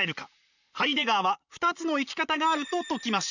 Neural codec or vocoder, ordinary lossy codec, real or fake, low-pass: vocoder, 44.1 kHz, 128 mel bands, Pupu-Vocoder; none; fake; 7.2 kHz